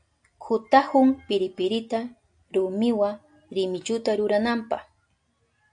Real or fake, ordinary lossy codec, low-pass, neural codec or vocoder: real; AAC, 64 kbps; 9.9 kHz; none